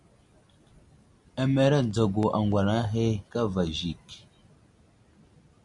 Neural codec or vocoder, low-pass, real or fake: none; 10.8 kHz; real